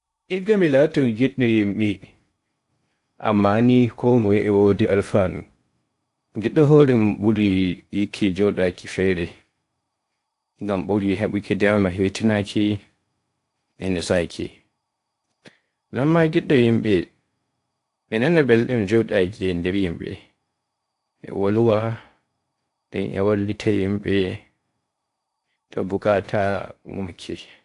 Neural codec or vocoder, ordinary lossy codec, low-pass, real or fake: codec, 16 kHz in and 24 kHz out, 0.6 kbps, FocalCodec, streaming, 2048 codes; AAC, 64 kbps; 10.8 kHz; fake